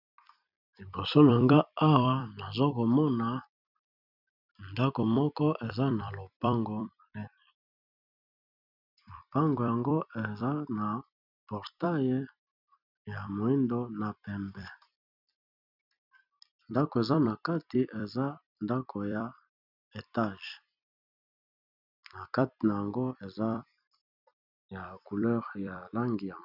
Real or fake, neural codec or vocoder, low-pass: fake; vocoder, 44.1 kHz, 128 mel bands every 256 samples, BigVGAN v2; 5.4 kHz